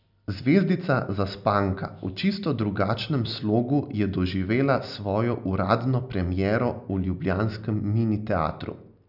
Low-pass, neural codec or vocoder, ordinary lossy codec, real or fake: 5.4 kHz; none; none; real